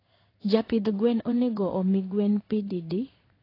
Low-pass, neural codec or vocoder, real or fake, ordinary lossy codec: 5.4 kHz; codec, 16 kHz in and 24 kHz out, 1 kbps, XY-Tokenizer; fake; AAC, 24 kbps